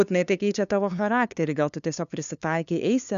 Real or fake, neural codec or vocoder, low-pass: fake; codec, 16 kHz, 2 kbps, FunCodec, trained on LibriTTS, 25 frames a second; 7.2 kHz